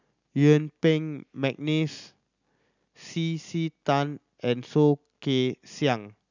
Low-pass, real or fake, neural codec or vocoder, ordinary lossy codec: 7.2 kHz; real; none; none